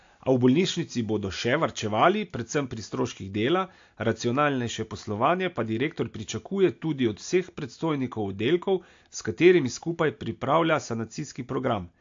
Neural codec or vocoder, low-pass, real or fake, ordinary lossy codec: none; 7.2 kHz; real; AAC, 48 kbps